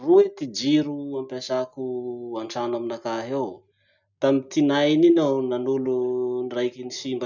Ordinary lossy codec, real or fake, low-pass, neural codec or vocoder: none; real; 7.2 kHz; none